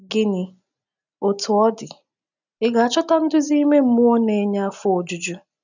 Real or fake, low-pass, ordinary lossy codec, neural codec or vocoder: real; 7.2 kHz; none; none